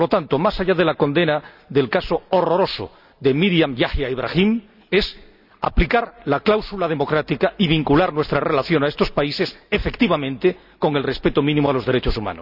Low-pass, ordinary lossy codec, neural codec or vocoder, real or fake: 5.4 kHz; none; none; real